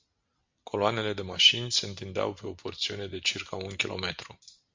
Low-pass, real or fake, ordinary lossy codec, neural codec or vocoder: 7.2 kHz; real; MP3, 64 kbps; none